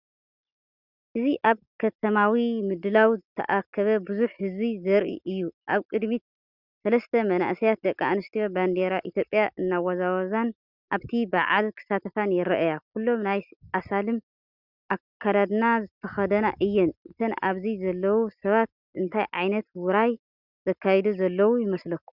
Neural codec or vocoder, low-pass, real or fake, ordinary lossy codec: none; 5.4 kHz; real; Opus, 64 kbps